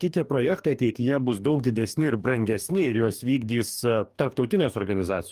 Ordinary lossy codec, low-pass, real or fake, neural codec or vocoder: Opus, 32 kbps; 14.4 kHz; fake; codec, 44.1 kHz, 2.6 kbps, DAC